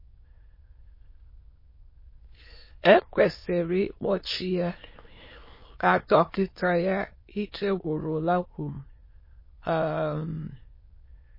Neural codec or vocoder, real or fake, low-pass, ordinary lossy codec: autoencoder, 22.05 kHz, a latent of 192 numbers a frame, VITS, trained on many speakers; fake; 5.4 kHz; MP3, 24 kbps